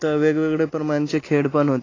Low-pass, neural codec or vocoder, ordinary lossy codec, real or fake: 7.2 kHz; none; AAC, 32 kbps; real